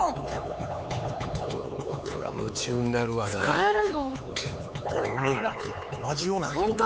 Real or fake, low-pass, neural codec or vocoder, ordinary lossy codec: fake; none; codec, 16 kHz, 4 kbps, X-Codec, HuBERT features, trained on LibriSpeech; none